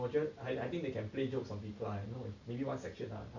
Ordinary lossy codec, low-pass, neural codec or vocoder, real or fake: none; 7.2 kHz; none; real